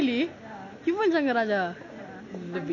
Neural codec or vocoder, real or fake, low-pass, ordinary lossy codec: none; real; 7.2 kHz; none